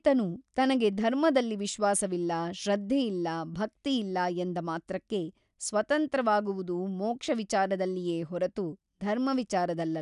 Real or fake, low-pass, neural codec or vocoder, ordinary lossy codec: real; 10.8 kHz; none; none